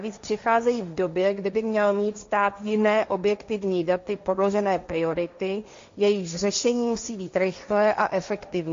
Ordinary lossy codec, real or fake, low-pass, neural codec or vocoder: MP3, 48 kbps; fake; 7.2 kHz; codec, 16 kHz, 1.1 kbps, Voila-Tokenizer